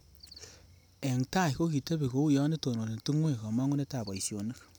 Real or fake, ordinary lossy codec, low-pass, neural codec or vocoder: real; none; none; none